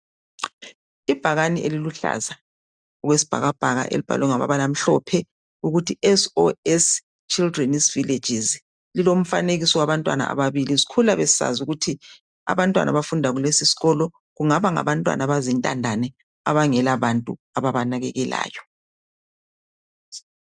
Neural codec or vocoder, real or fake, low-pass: none; real; 9.9 kHz